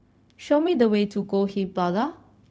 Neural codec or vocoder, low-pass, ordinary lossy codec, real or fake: codec, 16 kHz, 0.4 kbps, LongCat-Audio-Codec; none; none; fake